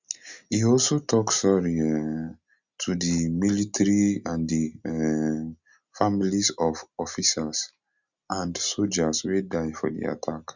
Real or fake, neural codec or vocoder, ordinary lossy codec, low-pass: real; none; Opus, 64 kbps; 7.2 kHz